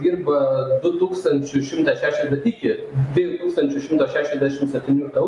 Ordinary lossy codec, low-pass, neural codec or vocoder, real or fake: AAC, 48 kbps; 10.8 kHz; none; real